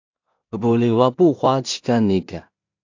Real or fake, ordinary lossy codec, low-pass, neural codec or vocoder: fake; AAC, 48 kbps; 7.2 kHz; codec, 16 kHz in and 24 kHz out, 0.4 kbps, LongCat-Audio-Codec, two codebook decoder